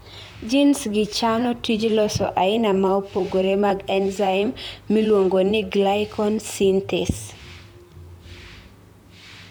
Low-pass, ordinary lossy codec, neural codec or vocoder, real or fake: none; none; vocoder, 44.1 kHz, 128 mel bands, Pupu-Vocoder; fake